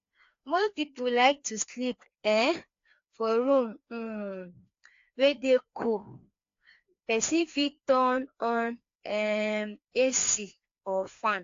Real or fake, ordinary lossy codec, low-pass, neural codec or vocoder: fake; AAC, 48 kbps; 7.2 kHz; codec, 16 kHz, 2 kbps, FreqCodec, larger model